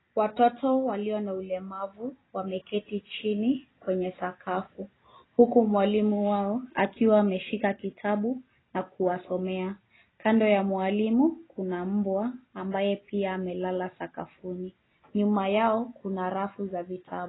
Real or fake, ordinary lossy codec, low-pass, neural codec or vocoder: real; AAC, 16 kbps; 7.2 kHz; none